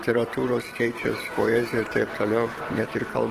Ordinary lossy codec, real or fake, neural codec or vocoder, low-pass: Opus, 16 kbps; fake; vocoder, 44.1 kHz, 128 mel bands every 512 samples, BigVGAN v2; 14.4 kHz